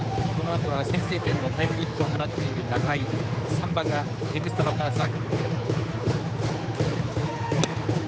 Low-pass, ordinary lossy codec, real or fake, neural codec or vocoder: none; none; fake; codec, 16 kHz, 4 kbps, X-Codec, HuBERT features, trained on general audio